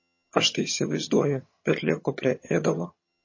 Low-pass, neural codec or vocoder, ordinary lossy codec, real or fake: 7.2 kHz; vocoder, 22.05 kHz, 80 mel bands, HiFi-GAN; MP3, 32 kbps; fake